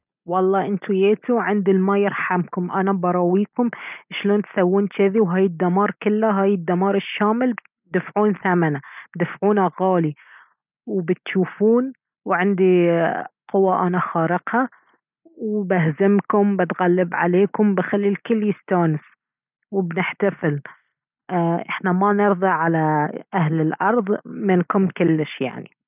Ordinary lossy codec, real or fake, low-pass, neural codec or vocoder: none; real; 3.6 kHz; none